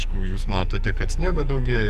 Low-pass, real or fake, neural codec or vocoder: 14.4 kHz; fake; codec, 44.1 kHz, 2.6 kbps, SNAC